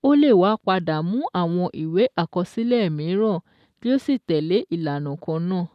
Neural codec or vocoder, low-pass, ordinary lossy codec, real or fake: none; 14.4 kHz; none; real